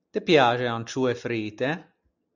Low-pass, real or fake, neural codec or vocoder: 7.2 kHz; real; none